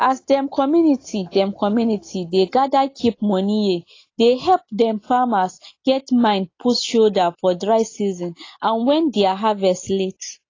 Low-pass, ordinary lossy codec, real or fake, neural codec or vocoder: 7.2 kHz; AAC, 32 kbps; real; none